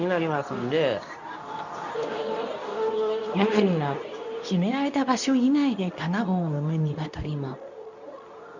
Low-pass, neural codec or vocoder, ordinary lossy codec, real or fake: 7.2 kHz; codec, 24 kHz, 0.9 kbps, WavTokenizer, medium speech release version 2; none; fake